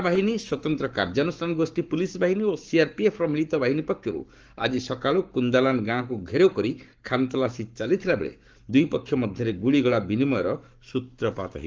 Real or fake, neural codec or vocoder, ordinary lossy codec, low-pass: fake; codec, 44.1 kHz, 7.8 kbps, Pupu-Codec; Opus, 24 kbps; 7.2 kHz